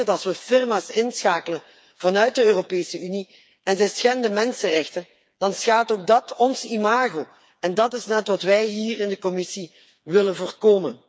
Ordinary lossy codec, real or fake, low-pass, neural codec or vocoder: none; fake; none; codec, 16 kHz, 4 kbps, FreqCodec, smaller model